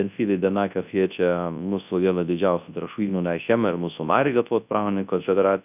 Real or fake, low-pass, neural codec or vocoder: fake; 3.6 kHz; codec, 24 kHz, 0.9 kbps, WavTokenizer, large speech release